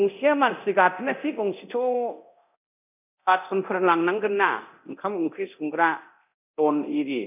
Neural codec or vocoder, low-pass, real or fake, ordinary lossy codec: codec, 24 kHz, 0.9 kbps, DualCodec; 3.6 kHz; fake; none